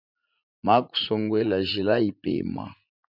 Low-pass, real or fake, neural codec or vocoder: 5.4 kHz; fake; vocoder, 44.1 kHz, 80 mel bands, Vocos